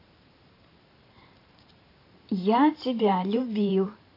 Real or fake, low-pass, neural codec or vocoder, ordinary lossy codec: fake; 5.4 kHz; vocoder, 44.1 kHz, 128 mel bands every 512 samples, BigVGAN v2; AAC, 32 kbps